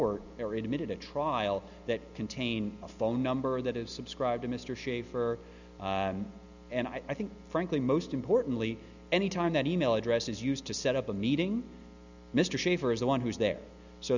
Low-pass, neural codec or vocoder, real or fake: 7.2 kHz; none; real